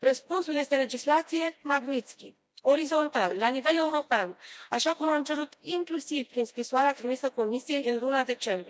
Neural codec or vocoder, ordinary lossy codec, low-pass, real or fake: codec, 16 kHz, 1 kbps, FreqCodec, smaller model; none; none; fake